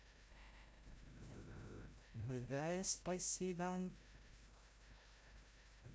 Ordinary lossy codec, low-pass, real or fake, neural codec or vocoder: none; none; fake; codec, 16 kHz, 0.5 kbps, FreqCodec, larger model